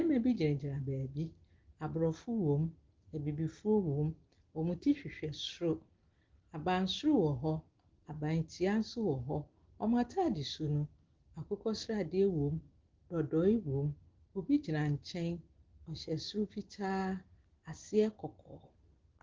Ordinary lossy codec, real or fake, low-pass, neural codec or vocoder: Opus, 16 kbps; real; 7.2 kHz; none